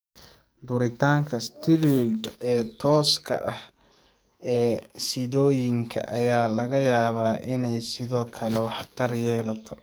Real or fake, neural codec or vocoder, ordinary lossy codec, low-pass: fake; codec, 44.1 kHz, 2.6 kbps, SNAC; none; none